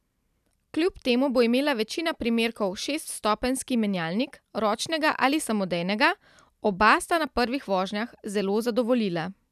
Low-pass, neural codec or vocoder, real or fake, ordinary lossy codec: 14.4 kHz; none; real; none